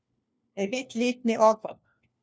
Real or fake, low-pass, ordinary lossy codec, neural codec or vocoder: fake; none; none; codec, 16 kHz, 1 kbps, FunCodec, trained on LibriTTS, 50 frames a second